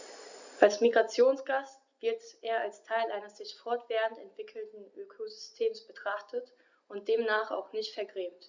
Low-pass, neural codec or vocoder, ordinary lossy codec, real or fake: 7.2 kHz; none; Opus, 64 kbps; real